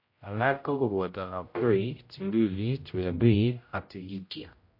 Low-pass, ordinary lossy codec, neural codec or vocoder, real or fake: 5.4 kHz; MP3, 32 kbps; codec, 16 kHz, 0.5 kbps, X-Codec, HuBERT features, trained on general audio; fake